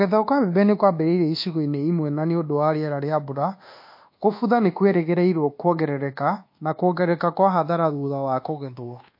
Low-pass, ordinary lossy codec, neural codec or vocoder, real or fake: 5.4 kHz; MP3, 32 kbps; codec, 24 kHz, 1.2 kbps, DualCodec; fake